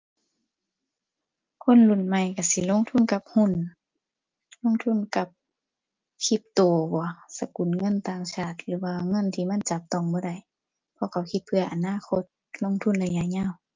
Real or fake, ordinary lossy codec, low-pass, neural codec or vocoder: real; Opus, 24 kbps; 7.2 kHz; none